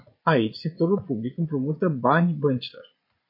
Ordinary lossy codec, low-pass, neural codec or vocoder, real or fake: MP3, 32 kbps; 5.4 kHz; vocoder, 22.05 kHz, 80 mel bands, Vocos; fake